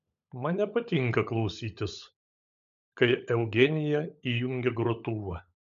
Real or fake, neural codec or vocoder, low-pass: fake; codec, 16 kHz, 16 kbps, FunCodec, trained on LibriTTS, 50 frames a second; 7.2 kHz